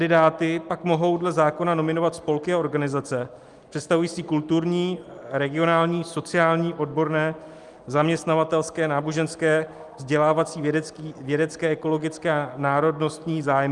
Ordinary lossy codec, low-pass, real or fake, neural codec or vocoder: Opus, 32 kbps; 10.8 kHz; real; none